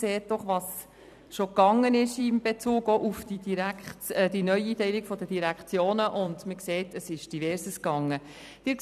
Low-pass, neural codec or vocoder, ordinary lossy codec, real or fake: 14.4 kHz; vocoder, 44.1 kHz, 128 mel bands every 256 samples, BigVGAN v2; none; fake